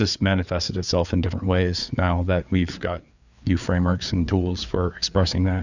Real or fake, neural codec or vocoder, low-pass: fake; codec, 16 kHz, 4 kbps, FreqCodec, larger model; 7.2 kHz